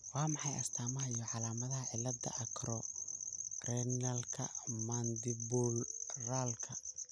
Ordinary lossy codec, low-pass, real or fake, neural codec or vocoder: none; none; real; none